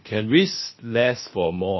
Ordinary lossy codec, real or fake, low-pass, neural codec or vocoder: MP3, 24 kbps; fake; 7.2 kHz; codec, 16 kHz, 0.7 kbps, FocalCodec